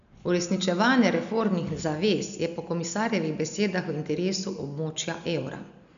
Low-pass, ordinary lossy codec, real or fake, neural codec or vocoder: 7.2 kHz; none; real; none